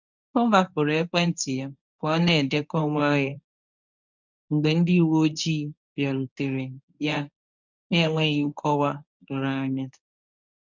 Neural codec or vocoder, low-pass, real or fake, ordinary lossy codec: codec, 24 kHz, 0.9 kbps, WavTokenizer, medium speech release version 1; 7.2 kHz; fake; none